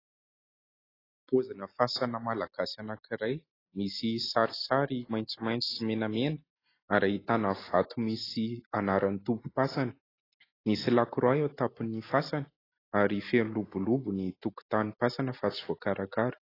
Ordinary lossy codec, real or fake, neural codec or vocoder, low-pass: AAC, 24 kbps; real; none; 5.4 kHz